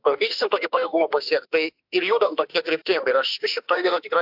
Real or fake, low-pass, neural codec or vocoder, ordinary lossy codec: fake; 5.4 kHz; autoencoder, 48 kHz, 32 numbers a frame, DAC-VAE, trained on Japanese speech; AAC, 48 kbps